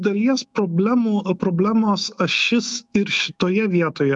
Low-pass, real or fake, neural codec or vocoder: 10.8 kHz; fake; autoencoder, 48 kHz, 128 numbers a frame, DAC-VAE, trained on Japanese speech